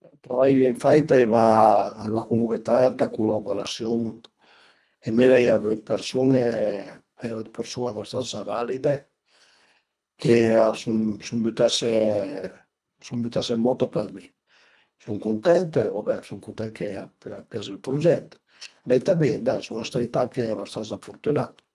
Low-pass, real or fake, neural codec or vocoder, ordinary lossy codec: none; fake; codec, 24 kHz, 1.5 kbps, HILCodec; none